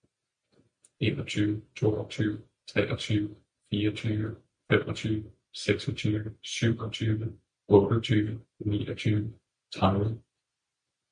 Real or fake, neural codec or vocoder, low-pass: real; none; 9.9 kHz